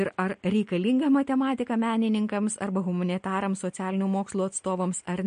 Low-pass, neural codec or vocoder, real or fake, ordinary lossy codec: 9.9 kHz; none; real; MP3, 48 kbps